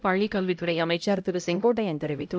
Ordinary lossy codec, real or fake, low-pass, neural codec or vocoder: none; fake; none; codec, 16 kHz, 0.5 kbps, X-Codec, HuBERT features, trained on LibriSpeech